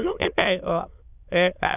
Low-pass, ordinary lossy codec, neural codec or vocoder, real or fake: 3.6 kHz; none; autoencoder, 22.05 kHz, a latent of 192 numbers a frame, VITS, trained on many speakers; fake